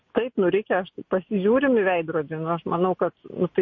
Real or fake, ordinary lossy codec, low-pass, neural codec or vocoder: real; MP3, 32 kbps; 7.2 kHz; none